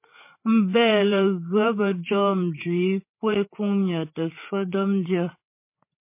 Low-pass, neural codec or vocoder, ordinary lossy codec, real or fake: 3.6 kHz; codec, 16 kHz, 8 kbps, FreqCodec, larger model; MP3, 24 kbps; fake